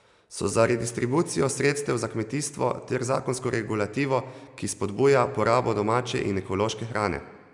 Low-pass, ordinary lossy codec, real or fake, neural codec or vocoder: 10.8 kHz; none; real; none